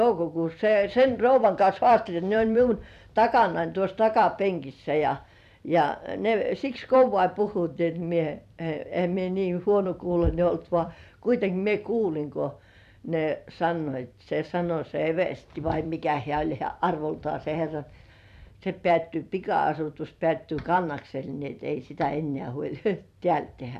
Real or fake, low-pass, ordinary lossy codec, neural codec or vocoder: fake; 14.4 kHz; none; vocoder, 44.1 kHz, 128 mel bands every 256 samples, BigVGAN v2